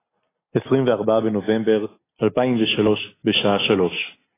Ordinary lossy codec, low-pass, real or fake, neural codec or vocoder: AAC, 16 kbps; 3.6 kHz; real; none